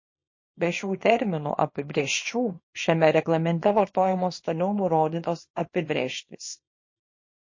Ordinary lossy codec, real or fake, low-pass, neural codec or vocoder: MP3, 32 kbps; fake; 7.2 kHz; codec, 24 kHz, 0.9 kbps, WavTokenizer, small release